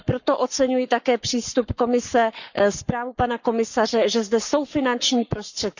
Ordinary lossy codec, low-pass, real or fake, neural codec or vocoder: none; 7.2 kHz; fake; codec, 44.1 kHz, 7.8 kbps, Pupu-Codec